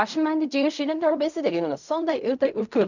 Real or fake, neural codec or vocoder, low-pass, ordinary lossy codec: fake; codec, 16 kHz in and 24 kHz out, 0.4 kbps, LongCat-Audio-Codec, fine tuned four codebook decoder; 7.2 kHz; none